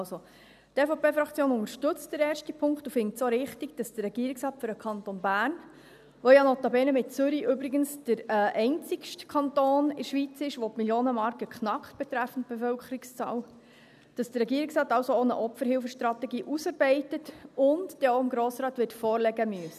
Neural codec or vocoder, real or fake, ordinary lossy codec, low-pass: none; real; none; 14.4 kHz